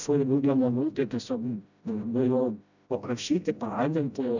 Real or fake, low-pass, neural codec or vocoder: fake; 7.2 kHz; codec, 16 kHz, 0.5 kbps, FreqCodec, smaller model